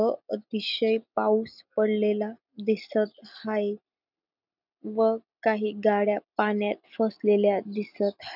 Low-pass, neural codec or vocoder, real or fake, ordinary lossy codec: 5.4 kHz; none; real; none